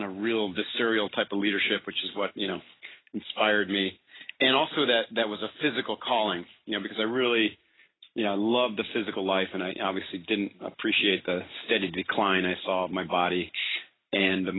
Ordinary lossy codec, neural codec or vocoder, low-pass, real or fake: AAC, 16 kbps; none; 7.2 kHz; real